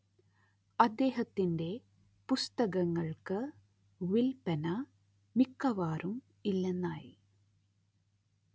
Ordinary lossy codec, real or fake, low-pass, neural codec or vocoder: none; real; none; none